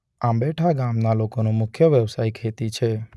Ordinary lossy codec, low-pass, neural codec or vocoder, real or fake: none; none; none; real